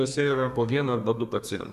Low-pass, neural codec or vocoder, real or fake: 14.4 kHz; codec, 32 kHz, 1.9 kbps, SNAC; fake